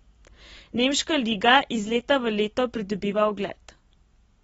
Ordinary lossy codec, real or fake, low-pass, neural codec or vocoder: AAC, 24 kbps; real; 10.8 kHz; none